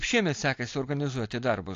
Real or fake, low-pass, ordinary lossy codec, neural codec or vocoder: real; 7.2 kHz; AAC, 48 kbps; none